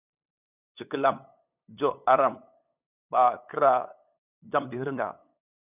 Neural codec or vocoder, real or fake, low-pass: codec, 16 kHz, 8 kbps, FunCodec, trained on LibriTTS, 25 frames a second; fake; 3.6 kHz